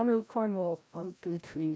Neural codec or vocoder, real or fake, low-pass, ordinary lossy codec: codec, 16 kHz, 0.5 kbps, FreqCodec, larger model; fake; none; none